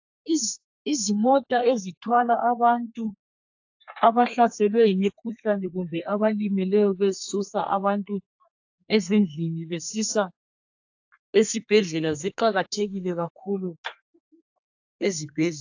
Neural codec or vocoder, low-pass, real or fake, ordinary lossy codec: codec, 32 kHz, 1.9 kbps, SNAC; 7.2 kHz; fake; AAC, 48 kbps